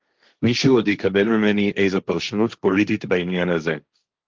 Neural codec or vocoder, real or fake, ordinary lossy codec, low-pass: codec, 16 kHz, 1.1 kbps, Voila-Tokenizer; fake; Opus, 32 kbps; 7.2 kHz